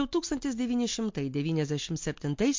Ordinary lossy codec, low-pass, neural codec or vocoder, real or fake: MP3, 48 kbps; 7.2 kHz; none; real